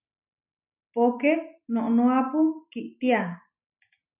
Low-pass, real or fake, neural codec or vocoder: 3.6 kHz; real; none